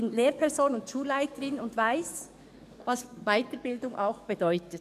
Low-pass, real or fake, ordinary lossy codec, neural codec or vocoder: 14.4 kHz; fake; none; codec, 44.1 kHz, 7.8 kbps, DAC